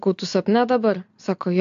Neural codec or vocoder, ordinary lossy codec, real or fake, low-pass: none; AAC, 64 kbps; real; 7.2 kHz